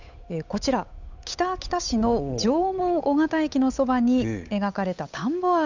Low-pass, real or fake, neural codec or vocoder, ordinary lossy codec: 7.2 kHz; fake; codec, 16 kHz, 8 kbps, FunCodec, trained on Chinese and English, 25 frames a second; none